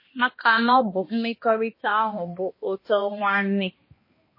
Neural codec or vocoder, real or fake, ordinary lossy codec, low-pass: codec, 16 kHz, 1 kbps, X-Codec, HuBERT features, trained on balanced general audio; fake; MP3, 24 kbps; 5.4 kHz